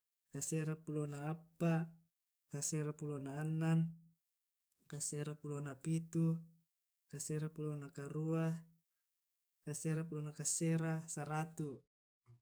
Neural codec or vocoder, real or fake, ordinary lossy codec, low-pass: codec, 44.1 kHz, 7.8 kbps, DAC; fake; none; none